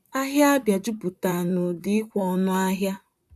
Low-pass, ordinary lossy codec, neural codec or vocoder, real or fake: 14.4 kHz; none; vocoder, 44.1 kHz, 128 mel bands, Pupu-Vocoder; fake